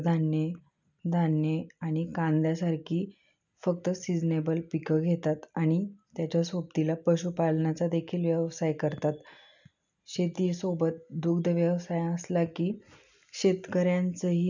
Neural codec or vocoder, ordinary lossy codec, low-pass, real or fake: none; none; 7.2 kHz; real